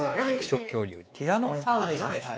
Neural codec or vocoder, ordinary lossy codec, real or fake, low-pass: codec, 16 kHz, 2 kbps, X-Codec, WavLM features, trained on Multilingual LibriSpeech; none; fake; none